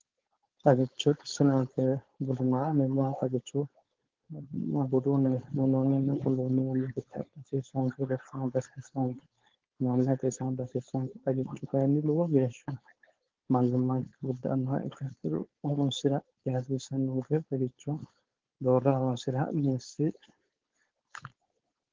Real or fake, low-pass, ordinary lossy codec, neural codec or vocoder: fake; 7.2 kHz; Opus, 16 kbps; codec, 16 kHz, 4.8 kbps, FACodec